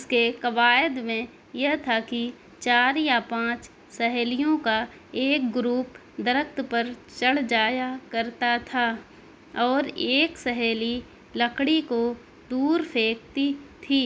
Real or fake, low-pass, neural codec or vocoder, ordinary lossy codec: real; none; none; none